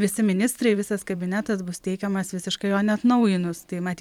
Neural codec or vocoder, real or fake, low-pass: vocoder, 44.1 kHz, 128 mel bands every 512 samples, BigVGAN v2; fake; 19.8 kHz